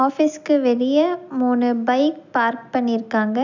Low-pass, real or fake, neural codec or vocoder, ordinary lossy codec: 7.2 kHz; real; none; none